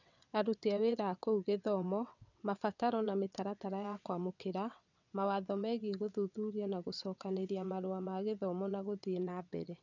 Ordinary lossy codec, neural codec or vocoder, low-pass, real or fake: none; vocoder, 22.05 kHz, 80 mel bands, WaveNeXt; 7.2 kHz; fake